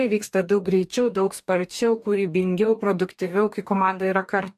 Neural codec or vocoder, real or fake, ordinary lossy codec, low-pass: codec, 44.1 kHz, 2.6 kbps, DAC; fake; AAC, 96 kbps; 14.4 kHz